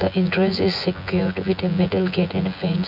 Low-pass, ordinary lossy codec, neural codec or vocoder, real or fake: 5.4 kHz; none; vocoder, 24 kHz, 100 mel bands, Vocos; fake